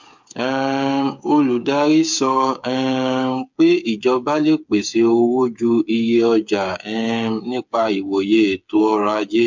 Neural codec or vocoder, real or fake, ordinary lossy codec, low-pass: codec, 16 kHz, 8 kbps, FreqCodec, smaller model; fake; MP3, 64 kbps; 7.2 kHz